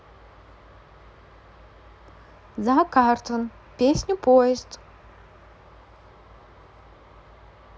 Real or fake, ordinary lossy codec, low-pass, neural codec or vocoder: real; none; none; none